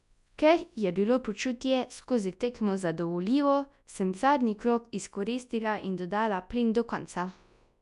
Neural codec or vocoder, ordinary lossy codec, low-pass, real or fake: codec, 24 kHz, 0.9 kbps, WavTokenizer, large speech release; none; 10.8 kHz; fake